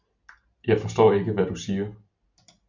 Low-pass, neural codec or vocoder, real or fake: 7.2 kHz; none; real